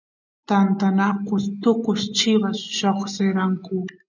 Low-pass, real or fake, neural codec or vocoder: 7.2 kHz; real; none